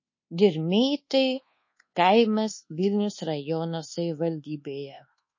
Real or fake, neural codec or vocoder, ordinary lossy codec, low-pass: fake; codec, 24 kHz, 1.2 kbps, DualCodec; MP3, 32 kbps; 7.2 kHz